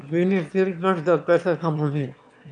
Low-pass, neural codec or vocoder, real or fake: 9.9 kHz; autoencoder, 22.05 kHz, a latent of 192 numbers a frame, VITS, trained on one speaker; fake